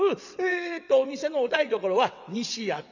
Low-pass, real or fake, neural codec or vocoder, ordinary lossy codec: 7.2 kHz; fake; vocoder, 22.05 kHz, 80 mel bands, WaveNeXt; none